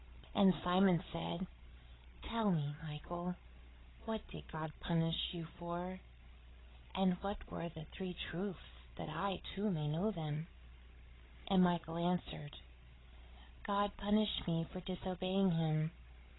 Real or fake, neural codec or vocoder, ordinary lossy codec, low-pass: fake; codec, 16 kHz, 16 kbps, FreqCodec, larger model; AAC, 16 kbps; 7.2 kHz